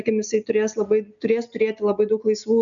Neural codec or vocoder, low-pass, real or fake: none; 7.2 kHz; real